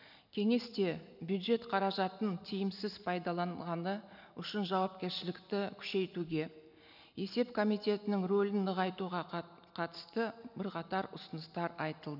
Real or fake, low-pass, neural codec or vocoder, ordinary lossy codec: real; 5.4 kHz; none; none